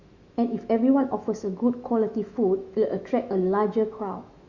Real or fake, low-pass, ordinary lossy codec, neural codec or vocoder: fake; 7.2 kHz; Opus, 64 kbps; autoencoder, 48 kHz, 128 numbers a frame, DAC-VAE, trained on Japanese speech